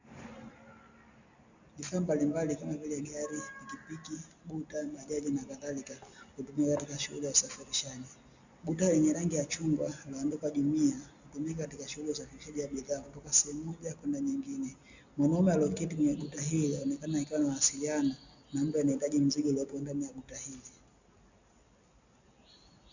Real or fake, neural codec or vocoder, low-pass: real; none; 7.2 kHz